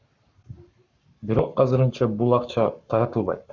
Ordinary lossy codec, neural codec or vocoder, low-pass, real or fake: Opus, 64 kbps; codec, 44.1 kHz, 7.8 kbps, Pupu-Codec; 7.2 kHz; fake